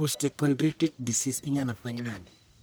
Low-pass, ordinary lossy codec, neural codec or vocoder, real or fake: none; none; codec, 44.1 kHz, 1.7 kbps, Pupu-Codec; fake